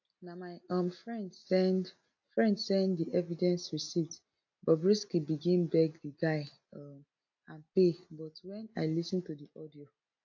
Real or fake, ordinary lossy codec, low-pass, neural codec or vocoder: real; AAC, 48 kbps; 7.2 kHz; none